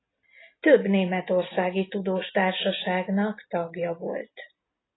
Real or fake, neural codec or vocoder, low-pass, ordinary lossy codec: real; none; 7.2 kHz; AAC, 16 kbps